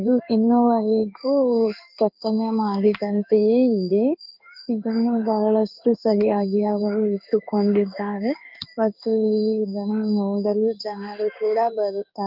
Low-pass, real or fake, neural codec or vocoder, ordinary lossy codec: 5.4 kHz; fake; codec, 16 kHz in and 24 kHz out, 1 kbps, XY-Tokenizer; Opus, 24 kbps